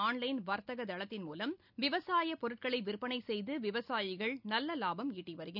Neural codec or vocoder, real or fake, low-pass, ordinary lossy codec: none; real; 5.4 kHz; none